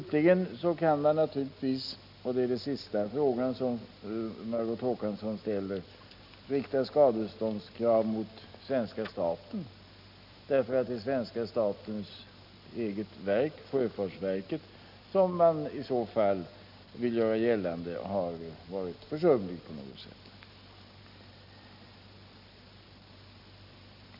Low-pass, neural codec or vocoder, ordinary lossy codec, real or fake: 5.4 kHz; none; none; real